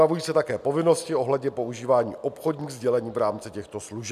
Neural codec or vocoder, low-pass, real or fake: none; 14.4 kHz; real